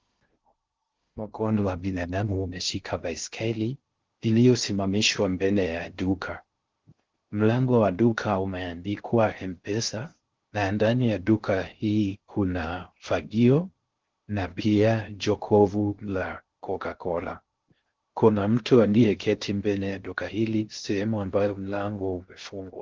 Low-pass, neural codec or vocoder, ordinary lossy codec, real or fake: 7.2 kHz; codec, 16 kHz in and 24 kHz out, 0.6 kbps, FocalCodec, streaming, 2048 codes; Opus, 16 kbps; fake